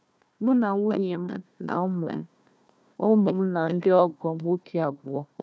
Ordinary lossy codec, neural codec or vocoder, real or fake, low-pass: none; codec, 16 kHz, 1 kbps, FunCodec, trained on Chinese and English, 50 frames a second; fake; none